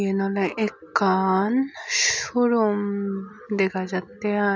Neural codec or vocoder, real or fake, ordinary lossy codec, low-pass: none; real; none; none